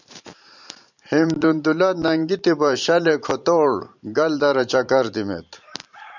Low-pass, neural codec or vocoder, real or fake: 7.2 kHz; vocoder, 44.1 kHz, 128 mel bands every 512 samples, BigVGAN v2; fake